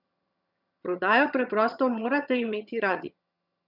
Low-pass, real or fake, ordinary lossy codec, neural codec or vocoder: 5.4 kHz; fake; none; vocoder, 22.05 kHz, 80 mel bands, HiFi-GAN